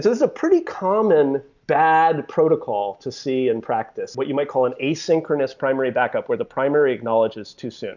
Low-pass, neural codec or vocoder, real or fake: 7.2 kHz; none; real